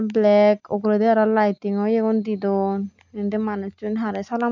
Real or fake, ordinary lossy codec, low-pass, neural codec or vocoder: real; none; 7.2 kHz; none